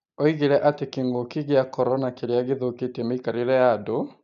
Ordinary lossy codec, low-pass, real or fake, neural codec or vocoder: none; 7.2 kHz; real; none